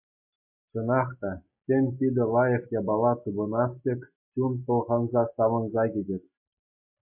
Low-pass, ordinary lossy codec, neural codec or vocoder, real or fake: 3.6 kHz; MP3, 32 kbps; none; real